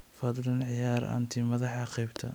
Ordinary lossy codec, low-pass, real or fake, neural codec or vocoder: none; none; real; none